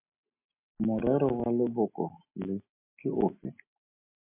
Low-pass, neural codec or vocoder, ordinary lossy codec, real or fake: 3.6 kHz; none; MP3, 24 kbps; real